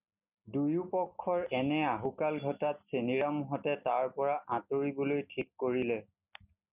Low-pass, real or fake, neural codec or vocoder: 3.6 kHz; real; none